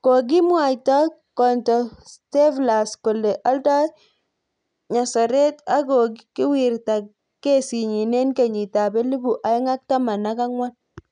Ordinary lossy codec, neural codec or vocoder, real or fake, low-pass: none; none; real; 9.9 kHz